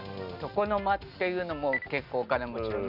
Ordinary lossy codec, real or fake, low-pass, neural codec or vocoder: none; real; 5.4 kHz; none